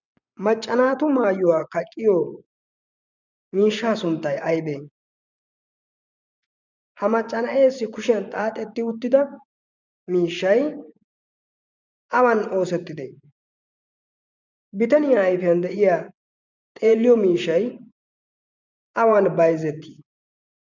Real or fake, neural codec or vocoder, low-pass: fake; vocoder, 24 kHz, 100 mel bands, Vocos; 7.2 kHz